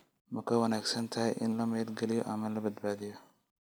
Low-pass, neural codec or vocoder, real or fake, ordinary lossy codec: none; none; real; none